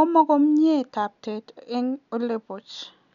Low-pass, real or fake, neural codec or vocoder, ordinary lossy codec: 7.2 kHz; real; none; none